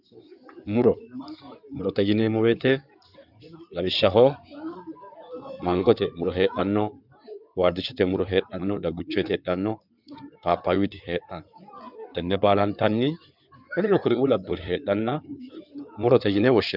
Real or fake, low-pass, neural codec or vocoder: fake; 5.4 kHz; codec, 16 kHz in and 24 kHz out, 2.2 kbps, FireRedTTS-2 codec